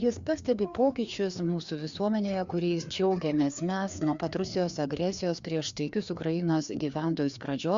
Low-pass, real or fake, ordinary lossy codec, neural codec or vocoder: 7.2 kHz; fake; Opus, 64 kbps; codec, 16 kHz, 2 kbps, FreqCodec, larger model